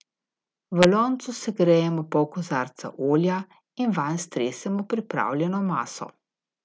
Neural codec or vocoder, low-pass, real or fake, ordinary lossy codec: none; none; real; none